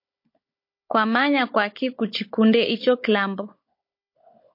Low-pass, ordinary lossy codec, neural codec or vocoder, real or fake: 5.4 kHz; MP3, 32 kbps; codec, 16 kHz, 16 kbps, FunCodec, trained on Chinese and English, 50 frames a second; fake